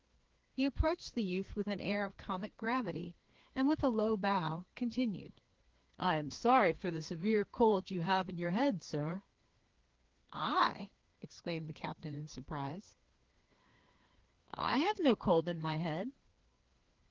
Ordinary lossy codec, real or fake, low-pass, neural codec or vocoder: Opus, 16 kbps; fake; 7.2 kHz; codec, 16 kHz, 2 kbps, FreqCodec, larger model